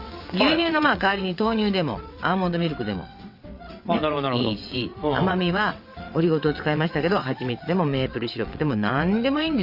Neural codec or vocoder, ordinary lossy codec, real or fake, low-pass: vocoder, 22.05 kHz, 80 mel bands, WaveNeXt; Opus, 64 kbps; fake; 5.4 kHz